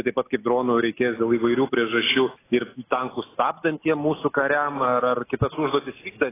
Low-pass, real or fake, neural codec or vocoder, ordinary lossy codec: 3.6 kHz; real; none; AAC, 16 kbps